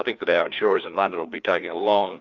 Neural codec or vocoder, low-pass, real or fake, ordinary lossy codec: codec, 16 kHz, 2 kbps, FreqCodec, larger model; 7.2 kHz; fake; Opus, 64 kbps